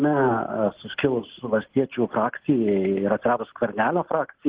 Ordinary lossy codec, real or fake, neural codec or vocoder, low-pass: Opus, 16 kbps; real; none; 3.6 kHz